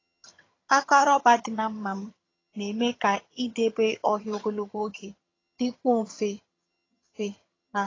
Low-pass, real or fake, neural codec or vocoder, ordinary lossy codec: 7.2 kHz; fake; vocoder, 22.05 kHz, 80 mel bands, HiFi-GAN; AAC, 32 kbps